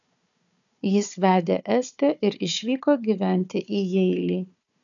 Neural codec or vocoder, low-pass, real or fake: codec, 16 kHz, 4 kbps, FunCodec, trained on Chinese and English, 50 frames a second; 7.2 kHz; fake